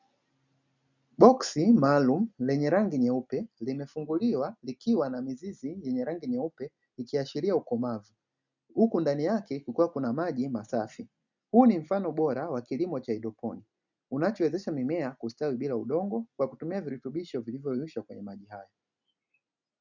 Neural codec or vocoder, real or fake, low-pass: none; real; 7.2 kHz